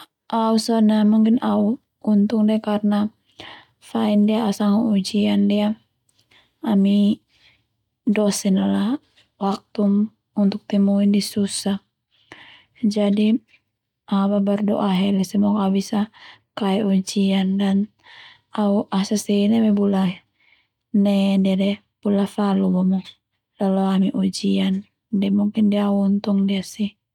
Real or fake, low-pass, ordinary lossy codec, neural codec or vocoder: real; 19.8 kHz; none; none